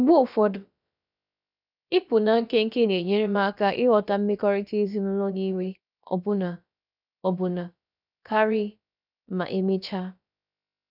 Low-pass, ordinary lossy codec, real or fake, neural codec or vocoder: 5.4 kHz; none; fake; codec, 16 kHz, about 1 kbps, DyCAST, with the encoder's durations